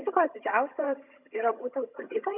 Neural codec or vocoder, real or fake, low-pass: vocoder, 22.05 kHz, 80 mel bands, HiFi-GAN; fake; 3.6 kHz